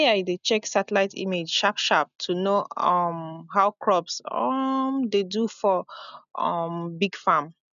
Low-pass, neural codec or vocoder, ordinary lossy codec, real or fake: 7.2 kHz; none; none; real